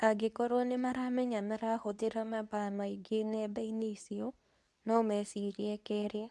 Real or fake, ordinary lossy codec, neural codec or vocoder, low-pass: fake; none; codec, 24 kHz, 0.9 kbps, WavTokenizer, medium speech release version 2; none